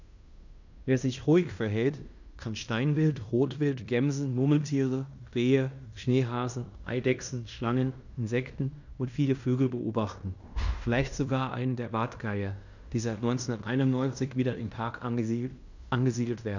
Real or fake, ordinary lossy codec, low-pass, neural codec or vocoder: fake; none; 7.2 kHz; codec, 16 kHz in and 24 kHz out, 0.9 kbps, LongCat-Audio-Codec, fine tuned four codebook decoder